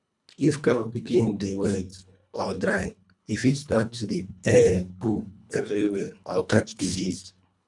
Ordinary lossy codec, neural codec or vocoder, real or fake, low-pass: none; codec, 24 kHz, 1.5 kbps, HILCodec; fake; 10.8 kHz